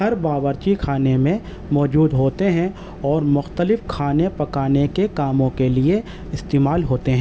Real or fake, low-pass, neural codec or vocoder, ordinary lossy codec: real; none; none; none